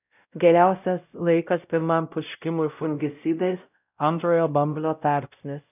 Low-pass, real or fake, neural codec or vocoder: 3.6 kHz; fake; codec, 16 kHz, 0.5 kbps, X-Codec, WavLM features, trained on Multilingual LibriSpeech